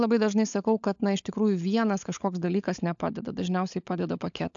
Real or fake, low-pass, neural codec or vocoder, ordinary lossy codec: fake; 7.2 kHz; codec, 16 kHz, 16 kbps, FunCodec, trained on LibriTTS, 50 frames a second; AAC, 64 kbps